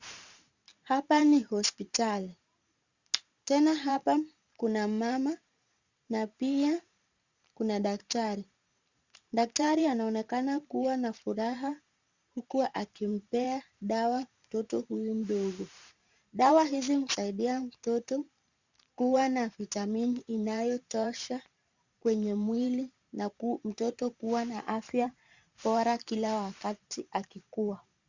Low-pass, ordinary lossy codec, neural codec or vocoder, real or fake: 7.2 kHz; Opus, 64 kbps; vocoder, 44.1 kHz, 128 mel bands every 512 samples, BigVGAN v2; fake